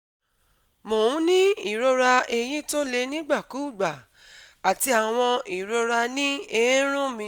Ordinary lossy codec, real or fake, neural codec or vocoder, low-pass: none; real; none; none